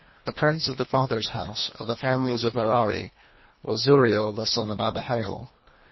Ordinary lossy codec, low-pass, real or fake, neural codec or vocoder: MP3, 24 kbps; 7.2 kHz; fake; codec, 24 kHz, 1.5 kbps, HILCodec